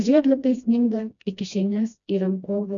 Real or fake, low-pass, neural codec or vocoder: fake; 7.2 kHz; codec, 16 kHz, 1 kbps, FreqCodec, smaller model